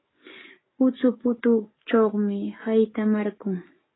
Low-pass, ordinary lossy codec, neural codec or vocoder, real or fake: 7.2 kHz; AAC, 16 kbps; codec, 44.1 kHz, 7.8 kbps, DAC; fake